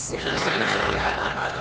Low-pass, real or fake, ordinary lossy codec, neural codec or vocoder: none; fake; none; codec, 16 kHz, 2 kbps, X-Codec, HuBERT features, trained on LibriSpeech